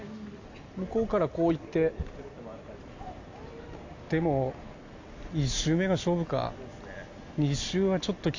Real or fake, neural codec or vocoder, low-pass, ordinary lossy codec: real; none; 7.2 kHz; AAC, 48 kbps